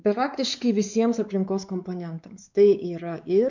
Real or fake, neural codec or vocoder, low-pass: fake; codec, 16 kHz, 4 kbps, X-Codec, WavLM features, trained on Multilingual LibriSpeech; 7.2 kHz